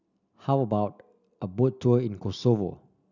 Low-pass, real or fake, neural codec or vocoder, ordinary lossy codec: 7.2 kHz; real; none; none